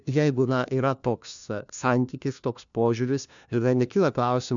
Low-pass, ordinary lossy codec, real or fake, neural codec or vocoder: 7.2 kHz; MP3, 96 kbps; fake; codec, 16 kHz, 1 kbps, FunCodec, trained on LibriTTS, 50 frames a second